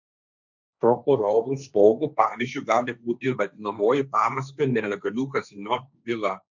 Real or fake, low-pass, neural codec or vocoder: fake; 7.2 kHz; codec, 16 kHz, 1.1 kbps, Voila-Tokenizer